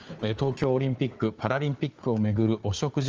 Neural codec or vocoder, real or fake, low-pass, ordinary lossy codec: codec, 16 kHz, 4 kbps, FunCodec, trained on Chinese and English, 50 frames a second; fake; 7.2 kHz; Opus, 24 kbps